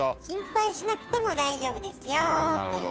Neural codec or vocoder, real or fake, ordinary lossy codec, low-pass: codec, 16 kHz, 2 kbps, FunCodec, trained on Chinese and English, 25 frames a second; fake; none; none